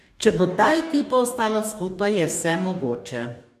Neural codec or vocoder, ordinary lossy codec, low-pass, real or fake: codec, 44.1 kHz, 2.6 kbps, DAC; none; 14.4 kHz; fake